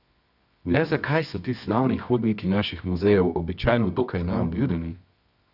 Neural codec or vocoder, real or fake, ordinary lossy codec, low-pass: codec, 24 kHz, 0.9 kbps, WavTokenizer, medium music audio release; fake; none; 5.4 kHz